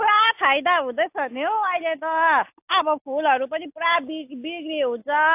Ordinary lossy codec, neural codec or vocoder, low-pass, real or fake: AAC, 32 kbps; none; 3.6 kHz; real